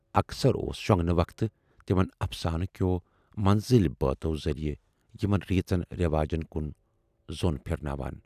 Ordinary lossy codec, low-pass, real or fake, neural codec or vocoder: none; 14.4 kHz; real; none